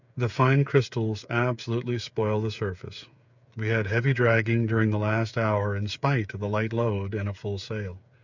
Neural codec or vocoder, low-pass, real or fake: codec, 16 kHz, 8 kbps, FreqCodec, smaller model; 7.2 kHz; fake